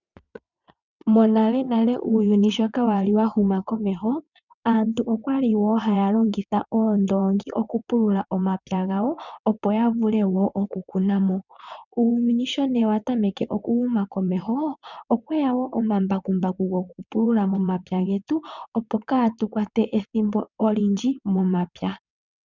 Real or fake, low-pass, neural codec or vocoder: fake; 7.2 kHz; vocoder, 22.05 kHz, 80 mel bands, WaveNeXt